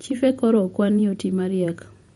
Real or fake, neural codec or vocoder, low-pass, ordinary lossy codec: fake; vocoder, 48 kHz, 128 mel bands, Vocos; 19.8 kHz; MP3, 48 kbps